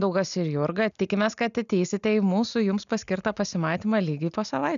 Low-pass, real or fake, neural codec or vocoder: 7.2 kHz; real; none